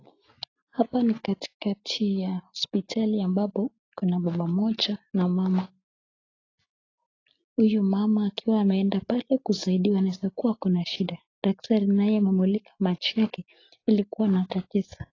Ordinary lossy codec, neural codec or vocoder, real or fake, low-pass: AAC, 32 kbps; none; real; 7.2 kHz